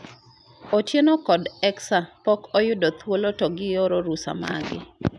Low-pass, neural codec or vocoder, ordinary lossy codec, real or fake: none; none; none; real